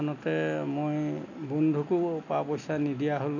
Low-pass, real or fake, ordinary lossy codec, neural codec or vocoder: 7.2 kHz; real; none; none